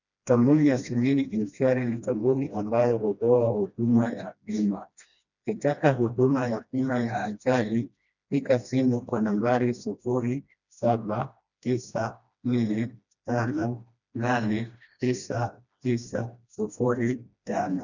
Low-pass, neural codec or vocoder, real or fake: 7.2 kHz; codec, 16 kHz, 1 kbps, FreqCodec, smaller model; fake